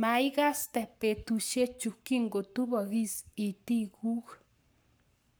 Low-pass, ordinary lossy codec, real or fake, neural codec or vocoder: none; none; fake; codec, 44.1 kHz, 7.8 kbps, Pupu-Codec